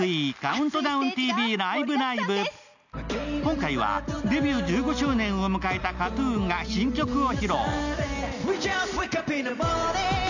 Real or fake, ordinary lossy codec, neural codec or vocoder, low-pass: real; none; none; 7.2 kHz